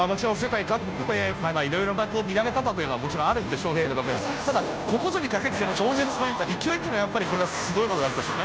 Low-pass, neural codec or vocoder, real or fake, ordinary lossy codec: none; codec, 16 kHz, 0.5 kbps, FunCodec, trained on Chinese and English, 25 frames a second; fake; none